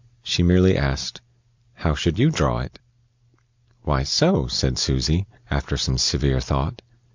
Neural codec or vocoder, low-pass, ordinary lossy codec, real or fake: none; 7.2 kHz; MP3, 64 kbps; real